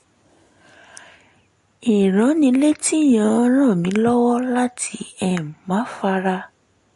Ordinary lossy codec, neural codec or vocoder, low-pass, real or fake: MP3, 48 kbps; none; 14.4 kHz; real